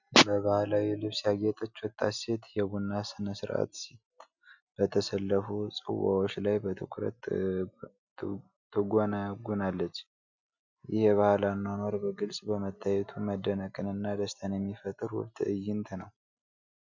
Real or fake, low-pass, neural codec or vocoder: real; 7.2 kHz; none